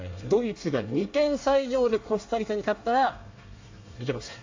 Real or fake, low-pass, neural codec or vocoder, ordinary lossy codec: fake; 7.2 kHz; codec, 24 kHz, 1 kbps, SNAC; AAC, 48 kbps